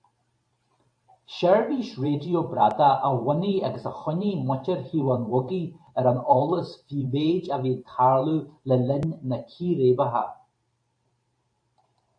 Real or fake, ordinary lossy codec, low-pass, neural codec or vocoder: fake; AAC, 64 kbps; 9.9 kHz; vocoder, 44.1 kHz, 128 mel bands every 512 samples, BigVGAN v2